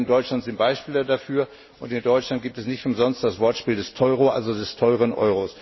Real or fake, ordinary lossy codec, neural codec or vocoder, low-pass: real; MP3, 24 kbps; none; 7.2 kHz